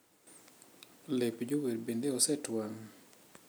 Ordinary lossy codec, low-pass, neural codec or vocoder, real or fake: none; none; none; real